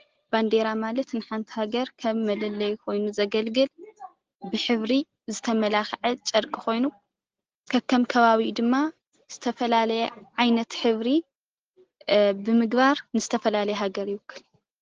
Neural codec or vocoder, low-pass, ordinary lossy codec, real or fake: none; 7.2 kHz; Opus, 16 kbps; real